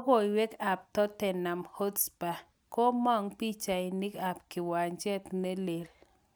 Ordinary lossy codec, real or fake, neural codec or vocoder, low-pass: none; real; none; none